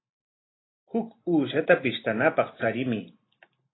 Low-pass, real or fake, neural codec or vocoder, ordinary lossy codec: 7.2 kHz; real; none; AAC, 16 kbps